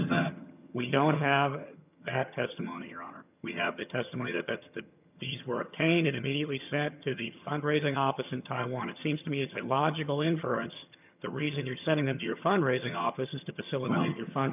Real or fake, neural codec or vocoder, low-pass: fake; vocoder, 22.05 kHz, 80 mel bands, HiFi-GAN; 3.6 kHz